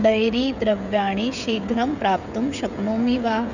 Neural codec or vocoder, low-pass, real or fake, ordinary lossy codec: codec, 16 kHz, 16 kbps, FreqCodec, smaller model; 7.2 kHz; fake; none